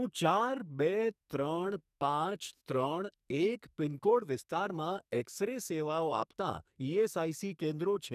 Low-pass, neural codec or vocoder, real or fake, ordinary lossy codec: 14.4 kHz; codec, 44.1 kHz, 2.6 kbps, SNAC; fake; none